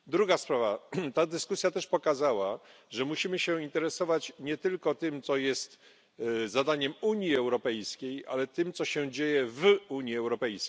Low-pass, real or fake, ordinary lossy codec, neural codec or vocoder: none; real; none; none